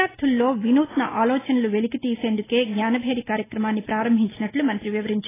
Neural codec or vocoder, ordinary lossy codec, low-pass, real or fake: none; AAC, 16 kbps; 3.6 kHz; real